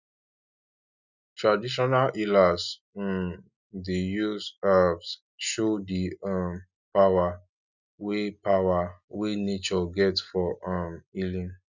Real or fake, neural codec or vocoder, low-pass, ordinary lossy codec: real; none; 7.2 kHz; none